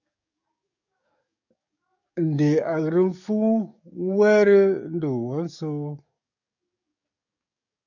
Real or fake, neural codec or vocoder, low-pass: fake; codec, 44.1 kHz, 7.8 kbps, DAC; 7.2 kHz